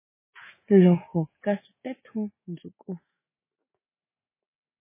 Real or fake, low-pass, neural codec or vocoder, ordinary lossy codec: fake; 3.6 kHz; autoencoder, 48 kHz, 128 numbers a frame, DAC-VAE, trained on Japanese speech; MP3, 16 kbps